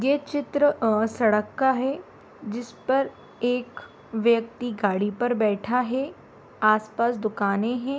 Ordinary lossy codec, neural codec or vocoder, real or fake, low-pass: none; none; real; none